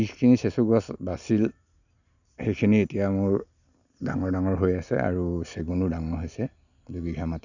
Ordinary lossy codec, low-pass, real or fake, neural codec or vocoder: none; 7.2 kHz; real; none